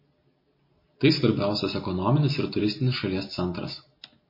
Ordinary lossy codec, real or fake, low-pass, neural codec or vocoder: MP3, 24 kbps; real; 5.4 kHz; none